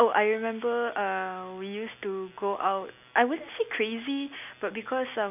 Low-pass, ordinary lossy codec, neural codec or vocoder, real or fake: 3.6 kHz; none; none; real